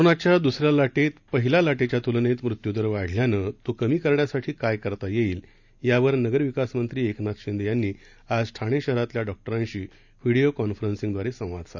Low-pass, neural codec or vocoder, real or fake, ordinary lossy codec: 7.2 kHz; none; real; none